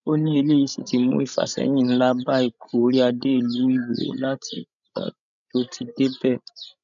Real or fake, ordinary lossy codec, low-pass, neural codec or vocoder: fake; MP3, 96 kbps; 7.2 kHz; codec, 16 kHz, 16 kbps, FreqCodec, larger model